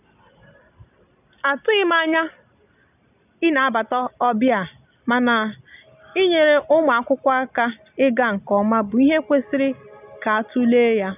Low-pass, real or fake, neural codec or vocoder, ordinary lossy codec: 3.6 kHz; real; none; none